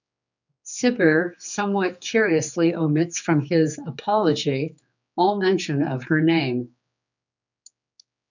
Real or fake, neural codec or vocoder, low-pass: fake; codec, 16 kHz, 4 kbps, X-Codec, HuBERT features, trained on general audio; 7.2 kHz